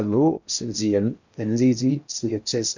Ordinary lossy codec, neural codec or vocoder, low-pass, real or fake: none; codec, 16 kHz in and 24 kHz out, 0.6 kbps, FocalCodec, streaming, 4096 codes; 7.2 kHz; fake